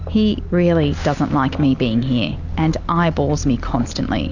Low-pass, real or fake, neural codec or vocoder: 7.2 kHz; real; none